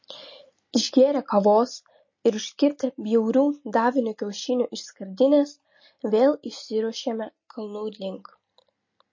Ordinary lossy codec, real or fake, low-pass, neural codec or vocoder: MP3, 32 kbps; real; 7.2 kHz; none